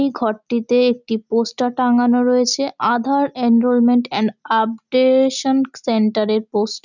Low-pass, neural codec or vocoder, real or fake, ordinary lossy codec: 7.2 kHz; none; real; none